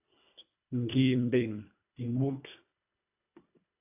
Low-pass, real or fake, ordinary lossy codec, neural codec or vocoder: 3.6 kHz; fake; AAC, 24 kbps; codec, 24 kHz, 1.5 kbps, HILCodec